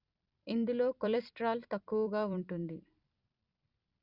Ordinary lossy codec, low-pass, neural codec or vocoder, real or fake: Opus, 64 kbps; 5.4 kHz; vocoder, 44.1 kHz, 128 mel bands every 256 samples, BigVGAN v2; fake